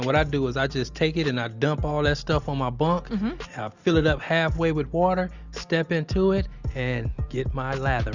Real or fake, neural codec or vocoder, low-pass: real; none; 7.2 kHz